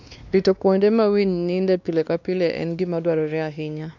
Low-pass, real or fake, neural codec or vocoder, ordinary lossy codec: 7.2 kHz; fake; codec, 16 kHz, 2 kbps, X-Codec, WavLM features, trained on Multilingual LibriSpeech; none